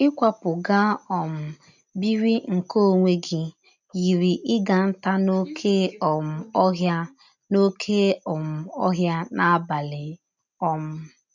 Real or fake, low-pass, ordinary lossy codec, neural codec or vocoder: real; 7.2 kHz; none; none